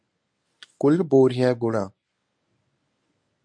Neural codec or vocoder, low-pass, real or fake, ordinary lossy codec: codec, 24 kHz, 0.9 kbps, WavTokenizer, medium speech release version 1; 9.9 kHz; fake; MP3, 64 kbps